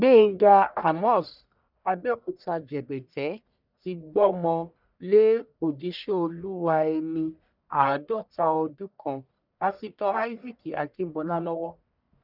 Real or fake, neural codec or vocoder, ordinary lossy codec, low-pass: fake; codec, 44.1 kHz, 1.7 kbps, Pupu-Codec; none; 5.4 kHz